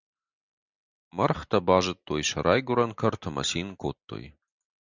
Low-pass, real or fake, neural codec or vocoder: 7.2 kHz; real; none